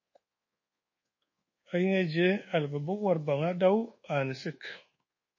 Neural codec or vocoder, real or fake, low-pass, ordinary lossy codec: codec, 24 kHz, 1.2 kbps, DualCodec; fake; 7.2 kHz; MP3, 32 kbps